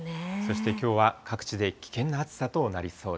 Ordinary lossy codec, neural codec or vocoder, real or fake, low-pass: none; none; real; none